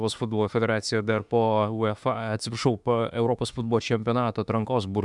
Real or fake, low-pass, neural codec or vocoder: fake; 10.8 kHz; autoencoder, 48 kHz, 32 numbers a frame, DAC-VAE, trained on Japanese speech